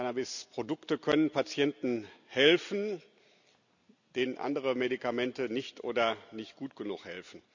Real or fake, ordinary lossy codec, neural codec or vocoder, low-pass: real; none; none; 7.2 kHz